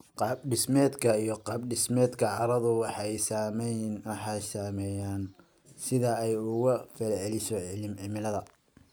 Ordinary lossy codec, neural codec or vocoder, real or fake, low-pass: none; none; real; none